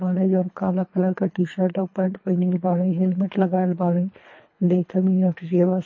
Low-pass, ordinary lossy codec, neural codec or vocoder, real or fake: 7.2 kHz; MP3, 32 kbps; codec, 24 kHz, 3 kbps, HILCodec; fake